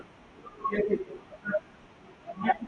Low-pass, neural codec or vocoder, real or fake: 9.9 kHz; none; real